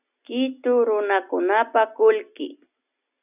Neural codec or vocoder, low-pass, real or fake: none; 3.6 kHz; real